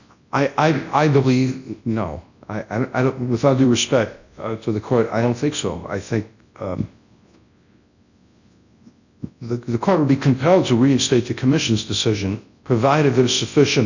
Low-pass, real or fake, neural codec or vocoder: 7.2 kHz; fake; codec, 24 kHz, 0.9 kbps, WavTokenizer, large speech release